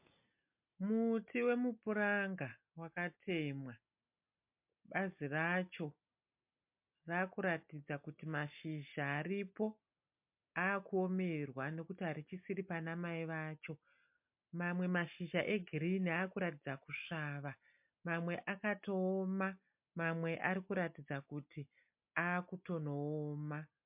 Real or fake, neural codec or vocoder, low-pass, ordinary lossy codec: real; none; 3.6 kHz; MP3, 32 kbps